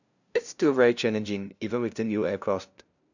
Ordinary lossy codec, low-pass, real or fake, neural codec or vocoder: none; 7.2 kHz; fake; codec, 16 kHz, 0.5 kbps, FunCodec, trained on LibriTTS, 25 frames a second